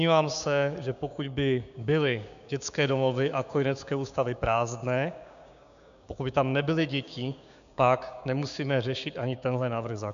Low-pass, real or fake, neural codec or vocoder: 7.2 kHz; fake; codec, 16 kHz, 6 kbps, DAC